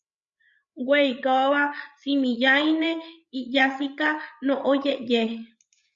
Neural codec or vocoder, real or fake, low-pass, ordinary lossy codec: codec, 16 kHz, 8 kbps, FreqCodec, larger model; fake; 7.2 kHz; Opus, 64 kbps